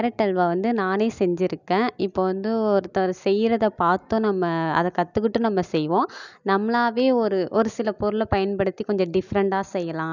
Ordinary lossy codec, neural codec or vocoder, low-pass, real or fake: none; none; 7.2 kHz; real